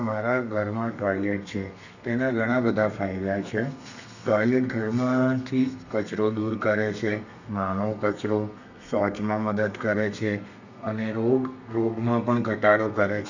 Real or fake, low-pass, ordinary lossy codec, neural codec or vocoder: fake; 7.2 kHz; none; codec, 32 kHz, 1.9 kbps, SNAC